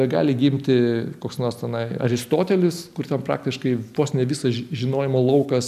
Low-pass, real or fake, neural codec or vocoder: 14.4 kHz; real; none